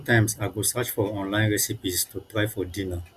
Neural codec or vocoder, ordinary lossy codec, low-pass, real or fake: none; Opus, 64 kbps; 14.4 kHz; real